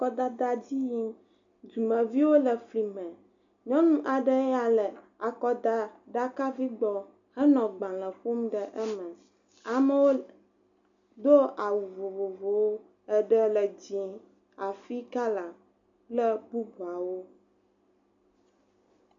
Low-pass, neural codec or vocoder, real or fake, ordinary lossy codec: 7.2 kHz; none; real; MP3, 96 kbps